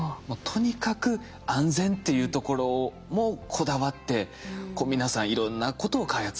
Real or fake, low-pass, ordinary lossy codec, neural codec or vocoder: real; none; none; none